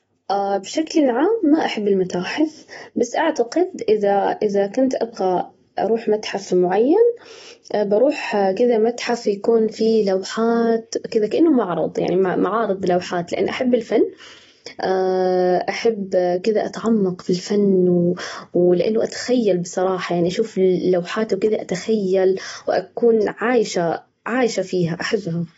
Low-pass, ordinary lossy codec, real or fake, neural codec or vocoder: 19.8 kHz; AAC, 24 kbps; real; none